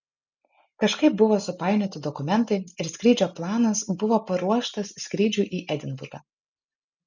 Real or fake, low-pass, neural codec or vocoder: real; 7.2 kHz; none